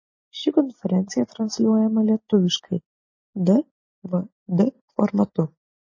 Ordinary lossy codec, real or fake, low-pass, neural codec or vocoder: MP3, 32 kbps; real; 7.2 kHz; none